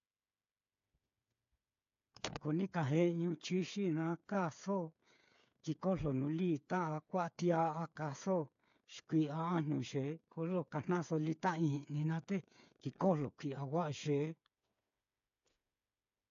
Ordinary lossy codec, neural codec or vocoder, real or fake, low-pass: AAC, 64 kbps; codec, 16 kHz, 8 kbps, FreqCodec, smaller model; fake; 7.2 kHz